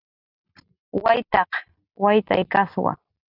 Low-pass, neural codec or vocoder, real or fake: 5.4 kHz; none; real